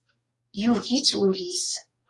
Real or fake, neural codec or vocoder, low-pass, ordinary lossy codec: fake; codec, 44.1 kHz, 2.6 kbps, DAC; 10.8 kHz; AAC, 32 kbps